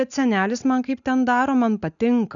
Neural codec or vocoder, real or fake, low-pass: none; real; 7.2 kHz